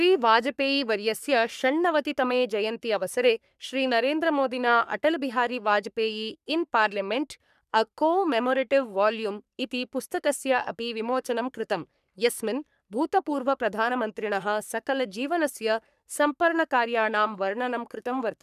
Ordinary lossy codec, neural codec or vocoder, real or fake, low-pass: none; codec, 44.1 kHz, 3.4 kbps, Pupu-Codec; fake; 14.4 kHz